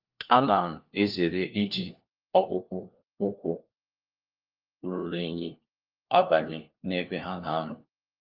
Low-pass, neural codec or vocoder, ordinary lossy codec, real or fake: 5.4 kHz; codec, 16 kHz, 1 kbps, FunCodec, trained on LibriTTS, 50 frames a second; Opus, 24 kbps; fake